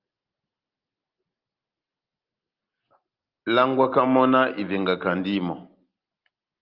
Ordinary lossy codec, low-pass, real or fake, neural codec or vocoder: Opus, 32 kbps; 5.4 kHz; real; none